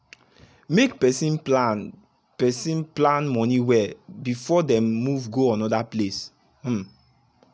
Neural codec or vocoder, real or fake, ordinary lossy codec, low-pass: none; real; none; none